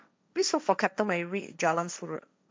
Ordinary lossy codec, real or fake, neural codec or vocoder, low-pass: none; fake; codec, 16 kHz, 1.1 kbps, Voila-Tokenizer; none